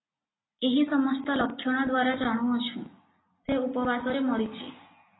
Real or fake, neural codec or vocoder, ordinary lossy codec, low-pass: real; none; AAC, 16 kbps; 7.2 kHz